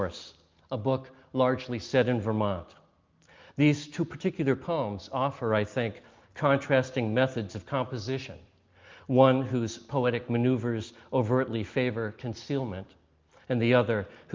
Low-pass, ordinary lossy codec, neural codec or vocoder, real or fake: 7.2 kHz; Opus, 32 kbps; none; real